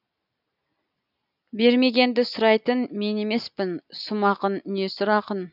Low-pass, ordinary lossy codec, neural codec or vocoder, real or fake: 5.4 kHz; none; none; real